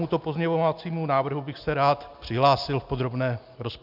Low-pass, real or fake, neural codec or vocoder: 5.4 kHz; real; none